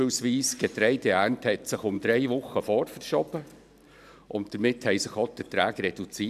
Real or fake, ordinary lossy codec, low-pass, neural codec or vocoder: fake; none; 14.4 kHz; vocoder, 44.1 kHz, 128 mel bands every 512 samples, BigVGAN v2